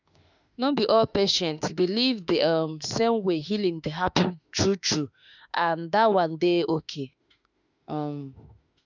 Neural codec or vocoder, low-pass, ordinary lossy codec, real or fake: autoencoder, 48 kHz, 32 numbers a frame, DAC-VAE, trained on Japanese speech; 7.2 kHz; none; fake